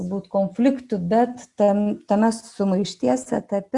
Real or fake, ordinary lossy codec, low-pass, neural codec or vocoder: real; Opus, 64 kbps; 10.8 kHz; none